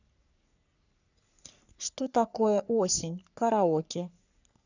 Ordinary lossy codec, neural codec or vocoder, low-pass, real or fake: none; codec, 44.1 kHz, 3.4 kbps, Pupu-Codec; 7.2 kHz; fake